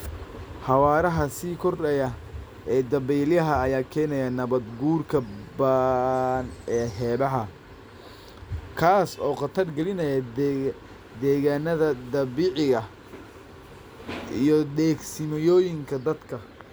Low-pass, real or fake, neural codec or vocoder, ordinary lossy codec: none; real; none; none